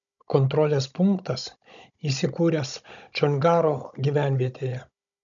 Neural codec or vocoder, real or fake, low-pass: codec, 16 kHz, 16 kbps, FunCodec, trained on Chinese and English, 50 frames a second; fake; 7.2 kHz